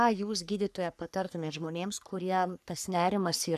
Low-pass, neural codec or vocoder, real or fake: 14.4 kHz; codec, 44.1 kHz, 3.4 kbps, Pupu-Codec; fake